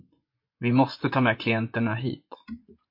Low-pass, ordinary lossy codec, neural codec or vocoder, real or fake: 5.4 kHz; MP3, 32 kbps; vocoder, 24 kHz, 100 mel bands, Vocos; fake